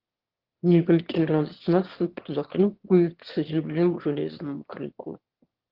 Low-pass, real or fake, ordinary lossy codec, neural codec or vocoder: 5.4 kHz; fake; Opus, 16 kbps; autoencoder, 22.05 kHz, a latent of 192 numbers a frame, VITS, trained on one speaker